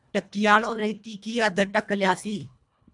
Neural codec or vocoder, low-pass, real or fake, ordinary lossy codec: codec, 24 kHz, 1.5 kbps, HILCodec; 10.8 kHz; fake; MP3, 96 kbps